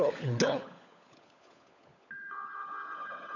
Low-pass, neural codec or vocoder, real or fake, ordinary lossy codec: 7.2 kHz; codec, 16 kHz, 16 kbps, FunCodec, trained on LibriTTS, 50 frames a second; fake; none